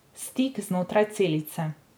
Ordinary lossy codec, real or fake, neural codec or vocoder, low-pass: none; real; none; none